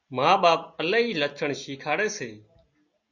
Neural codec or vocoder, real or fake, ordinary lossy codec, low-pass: none; real; Opus, 64 kbps; 7.2 kHz